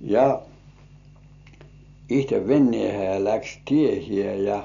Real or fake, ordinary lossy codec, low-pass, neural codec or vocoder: real; none; 7.2 kHz; none